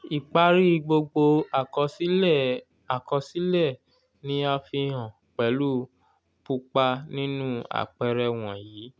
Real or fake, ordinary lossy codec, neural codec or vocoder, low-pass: real; none; none; none